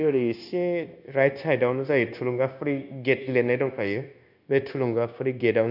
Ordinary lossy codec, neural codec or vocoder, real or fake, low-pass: none; codec, 16 kHz, 0.9 kbps, LongCat-Audio-Codec; fake; 5.4 kHz